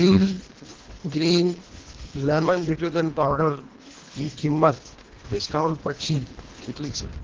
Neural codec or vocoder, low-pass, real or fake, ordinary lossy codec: codec, 24 kHz, 1.5 kbps, HILCodec; 7.2 kHz; fake; Opus, 16 kbps